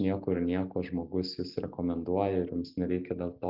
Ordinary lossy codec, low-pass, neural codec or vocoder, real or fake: Opus, 32 kbps; 5.4 kHz; none; real